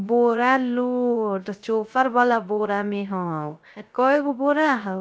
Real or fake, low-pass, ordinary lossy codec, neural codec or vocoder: fake; none; none; codec, 16 kHz, 0.3 kbps, FocalCodec